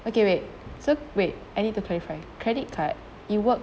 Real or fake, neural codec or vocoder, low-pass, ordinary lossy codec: real; none; none; none